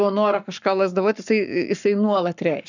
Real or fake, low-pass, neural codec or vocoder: fake; 7.2 kHz; codec, 44.1 kHz, 7.8 kbps, Pupu-Codec